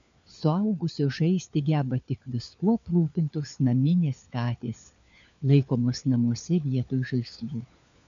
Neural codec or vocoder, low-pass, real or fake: codec, 16 kHz, 4 kbps, FunCodec, trained on LibriTTS, 50 frames a second; 7.2 kHz; fake